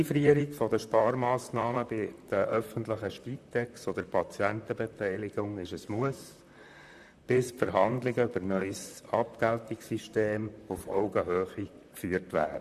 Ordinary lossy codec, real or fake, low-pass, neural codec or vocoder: none; fake; 14.4 kHz; vocoder, 44.1 kHz, 128 mel bands, Pupu-Vocoder